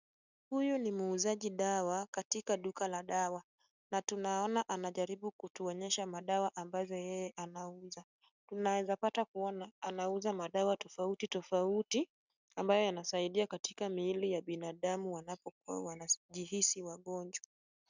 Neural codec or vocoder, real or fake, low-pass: none; real; 7.2 kHz